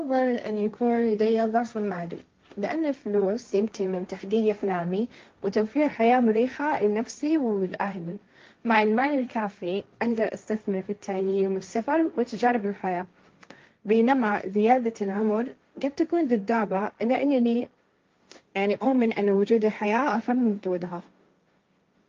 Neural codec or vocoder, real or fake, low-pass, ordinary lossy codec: codec, 16 kHz, 1.1 kbps, Voila-Tokenizer; fake; 7.2 kHz; Opus, 24 kbps